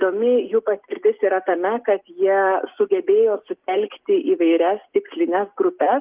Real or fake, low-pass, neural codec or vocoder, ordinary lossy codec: real; 3.6 kHz; none; Opus, 32 kbps